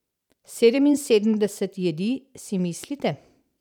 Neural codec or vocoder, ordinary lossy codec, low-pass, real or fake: vocoder, 44.1 kHz, 128 mel bands every 512 samples, BigVGAN v2; none; 19.8 kHz; fake